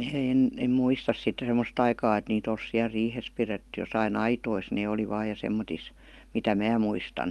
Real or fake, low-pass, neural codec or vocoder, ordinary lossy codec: real; 19.8 kHz; none; Opus, 32 kbps